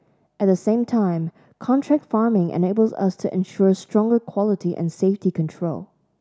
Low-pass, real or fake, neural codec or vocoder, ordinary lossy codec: none; real; none; none